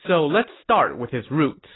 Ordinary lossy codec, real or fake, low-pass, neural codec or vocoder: AAC, 16 kbps; real; 7.2 kHz; none